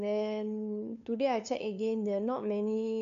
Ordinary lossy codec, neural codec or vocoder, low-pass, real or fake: none; codec, 16 kHz, 2 kbps, FunCodec, trained on LibriTTS, 25 frames a second; 7.2 kHz; fake